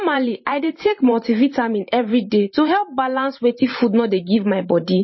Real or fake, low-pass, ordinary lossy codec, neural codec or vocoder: fake; 7.2 kHz; MP3, 24 kbps; vocoder, 44.1 kHz, 128 mel bands every 512 samples, BigVGAN v2